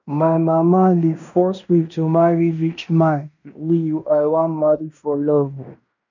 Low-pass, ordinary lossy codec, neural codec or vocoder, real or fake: 7.2 kHz; none; codec, 16 kHz in and 24 kHz out, 0.9 kbps, LongCat-Audio-Codec, fine tuned four codebook decoder; fake